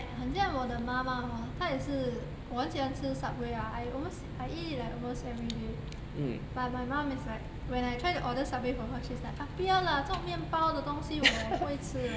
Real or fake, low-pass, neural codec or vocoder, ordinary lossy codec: real; none; none; none